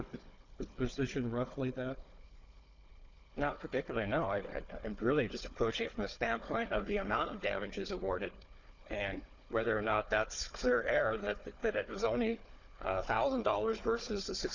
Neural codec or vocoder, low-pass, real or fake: codec, 16 kHz, 4 kbps, FunCodec, trained on Chinese and English, 50 frames a second; 7.2 kHz; fake